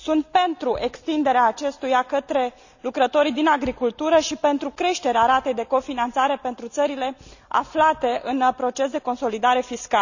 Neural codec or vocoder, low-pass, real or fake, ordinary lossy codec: none; 7.2 kHz; real; none